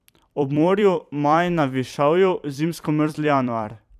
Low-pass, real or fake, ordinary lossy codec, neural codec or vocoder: 14.4 kHz; fake; none; vocoder, 44.1 kHz, 128 mel bands every 256 samples, BigVGAN v2